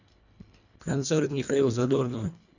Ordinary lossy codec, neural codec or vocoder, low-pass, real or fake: none; codec, 24 kHz, 1.5 kbps, HILCodec; 7.2 kHz; fake